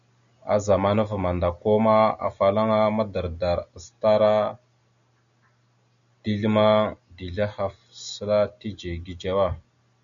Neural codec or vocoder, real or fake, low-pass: none; real; 7.2 kHz